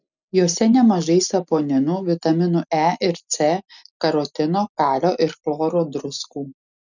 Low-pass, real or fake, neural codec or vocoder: 7.2 kHz; real; none